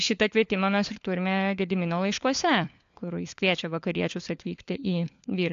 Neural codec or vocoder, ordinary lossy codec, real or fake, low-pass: codec, 16 kHz, 4 kbps, FunCodec, trained on LibriTTS, 50 frames a second; AAC, 64 kbps; fake; 7.2 kHz